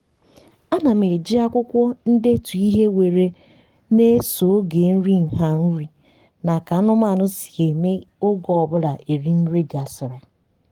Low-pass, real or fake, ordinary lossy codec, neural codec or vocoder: 19.8 kHz; fake; Opus, 24 kbps; codec, 44.1 kHz, 7.8 kbps, Pupu-Codec